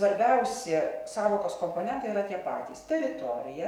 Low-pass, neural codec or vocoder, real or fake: 19.8 kHz; codec, 44.1 kHz, 7.8 kbps, DAC; fake